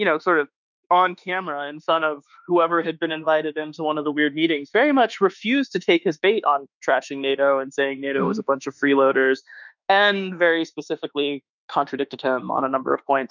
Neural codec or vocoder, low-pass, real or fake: autoencoder, 48 kHz, 32 numbers a frame, DAC-VAE, trained on Japanese speech; 7.2 kHz; fake